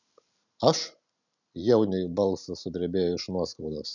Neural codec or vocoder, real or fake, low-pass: none; real; 7.2 kHz